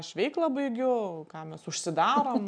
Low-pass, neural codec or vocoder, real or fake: 9.9 kHz; none; real